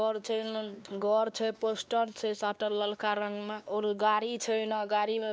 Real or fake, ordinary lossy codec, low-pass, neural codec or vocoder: fake; none; none; codec, 16 kHz, 2 kbps, X-Codec, WavLM features, trained on Multilingual LibriSpeech